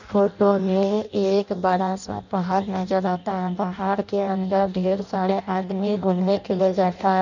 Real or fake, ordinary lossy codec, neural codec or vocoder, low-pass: fake; none; codec, 16 kHz in and 24 kHz out, 0.6 kbps, FireRedTTS-2 codec; 7.2 kHz